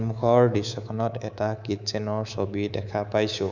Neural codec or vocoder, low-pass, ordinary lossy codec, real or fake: codec, 24 kHz, 3.1 kbps, DualCodec; 7.2 kHz; none; fake